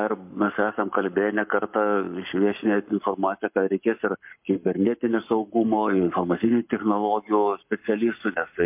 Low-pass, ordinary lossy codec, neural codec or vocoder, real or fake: 3.6 kHz; MP3, 32 kbps; codec, 44.1 kHz, 7.8 kbps, Pupu-Codec; fake